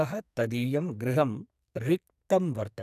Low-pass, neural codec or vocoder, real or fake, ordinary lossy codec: 14.4 kHz; codec, 44.1 kHz, 2.6 kbps, SNAC; fake; none